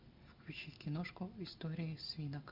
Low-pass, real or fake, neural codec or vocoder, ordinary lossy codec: 5.4 kHz; real; none; MP3, 48 kbps